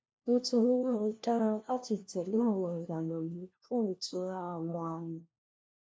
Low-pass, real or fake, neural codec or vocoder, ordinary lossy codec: none; fake; codec, 16 kHz, 1 kbps, FunCodec, trained on LibriTTS, 50 frames a second; none